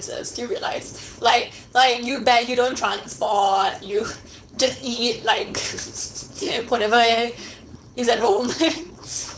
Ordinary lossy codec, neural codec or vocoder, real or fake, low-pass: none; codec, 16 kHz, 4.8 kbps, FACodec; fake; none